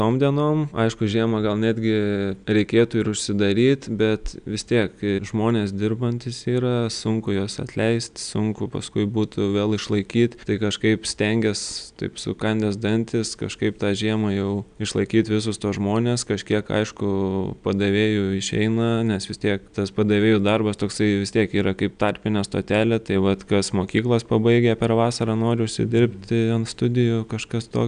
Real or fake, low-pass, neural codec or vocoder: real; 9.9 kHz; none